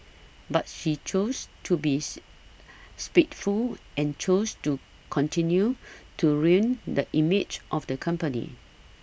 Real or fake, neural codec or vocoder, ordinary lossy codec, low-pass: real; none; none; none